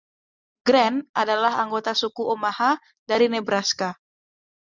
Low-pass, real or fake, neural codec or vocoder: 7.2 kHz; real; none